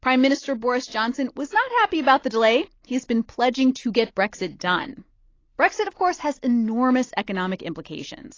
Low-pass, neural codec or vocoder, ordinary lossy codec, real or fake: 7.2 kHz; none; AAC, 32 kbps; real